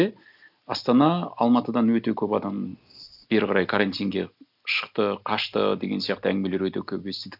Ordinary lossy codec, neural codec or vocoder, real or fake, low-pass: none; none; real; 5.4 kHz